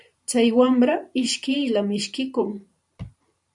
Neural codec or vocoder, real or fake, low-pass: vocoder, 44.1 kHz, 128 mel bands every 512 samples, BigVGAN v2; fake; 10.8 kHz